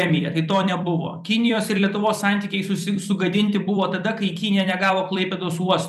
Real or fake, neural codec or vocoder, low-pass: fake; vocoder, 44.1 kHz, 128 mel bands every 256 samples, BigVGAN v2; 14.4 kHz